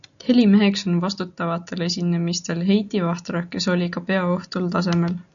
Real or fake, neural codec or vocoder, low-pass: real; none; 7.2 kHz